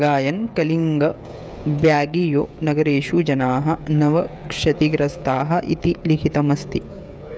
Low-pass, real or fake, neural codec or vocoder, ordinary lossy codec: none; fake; codec, 16 kHz, 16 kbps, FreqCodec, smaller model; none